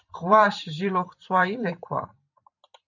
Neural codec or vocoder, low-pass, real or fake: vocoder, 44.1 kHz, 128 mel bands every 512 samples, BigVGAN v2; 7.2 kHz; fake